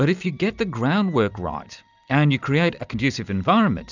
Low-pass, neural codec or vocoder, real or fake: 7.2 kHz; none; real